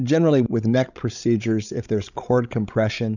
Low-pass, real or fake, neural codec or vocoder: 7.2 kHz; fake; codec, 16 kHz, 16 kbps, FreqCodec, larger model